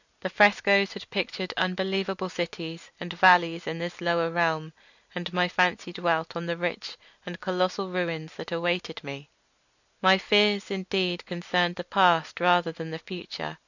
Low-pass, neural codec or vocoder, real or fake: 7.2 kHz; none; real